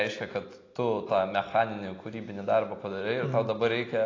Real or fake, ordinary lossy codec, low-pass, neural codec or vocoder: real; AAC, 32 kbps; 7.2 kHz; none